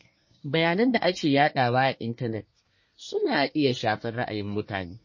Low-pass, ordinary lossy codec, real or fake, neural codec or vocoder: 7.2 kHz; MP3, 32 kbps; fake; codec, 24 kHz, 1 kbps, SNAC